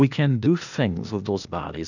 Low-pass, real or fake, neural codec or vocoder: 7.2 kHz; fake; codec, 16 kHz, 0.8 kbps, ZipCodec